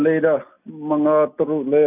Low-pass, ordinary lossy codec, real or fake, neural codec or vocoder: 3.6 kHz; none; real; none